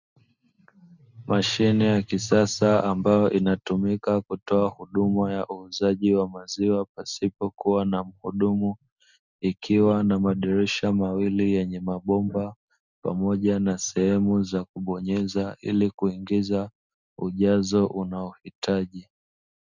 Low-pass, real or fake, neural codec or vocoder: 7.2 kHz; real; none